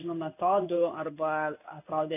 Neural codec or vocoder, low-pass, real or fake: codec, 16 kHz in and 24 kHz out, 1 kbps, XY-Tokenizer; 3.6 kHz; fake